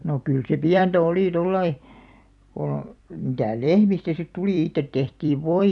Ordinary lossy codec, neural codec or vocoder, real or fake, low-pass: none; vocoder, 44.1 kHz, 128 mel bands every 256 samples, BigVGAN v2; fake; 10.8 kHz